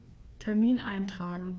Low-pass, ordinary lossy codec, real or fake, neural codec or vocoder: none; none; fake; codec, 16 kHz, 2 kbps, FreqCodec, larger model